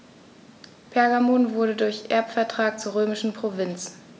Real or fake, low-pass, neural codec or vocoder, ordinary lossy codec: real; none; none; none